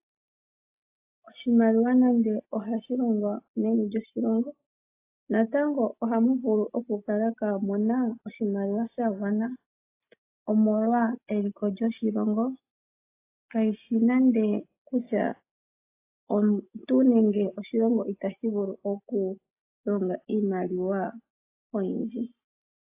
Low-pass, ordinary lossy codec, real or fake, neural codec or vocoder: 3.6 kHz; AAC, 24 kbps; real; none